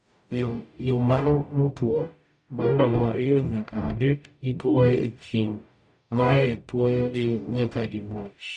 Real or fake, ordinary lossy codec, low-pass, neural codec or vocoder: fake; MP3, 96 kbps; 9.9 kHz; codec, 44.1 kHz, 0.9 kbps, DAC